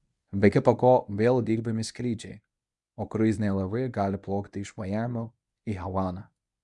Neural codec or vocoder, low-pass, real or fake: codec, 24 kHz, 0.9 kbps, WavTokenizer, medium speech release version 1; 10.8 kHz; fake